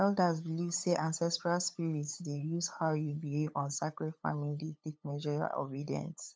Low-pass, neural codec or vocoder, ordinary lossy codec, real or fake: none; codec, 16 kHz, 8 kbps, FunCodec, trained on LibriTTS, 25 frames a second; none; fake